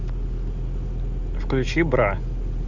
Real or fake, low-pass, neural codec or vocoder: real; 7.2 kHz; none